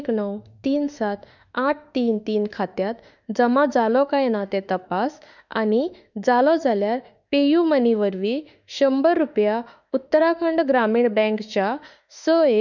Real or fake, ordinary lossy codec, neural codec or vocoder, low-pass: fake; none; autoencoder, 48 kHz, 32 numbers a frame, DAC-VAE, trained on Japanese speech; 7.2 kHz